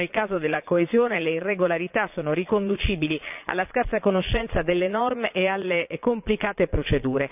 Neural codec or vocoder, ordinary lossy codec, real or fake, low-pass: vocoder, 22.05 kHz, 80 mel bands, Vocos; none; fake; 3.6 kHz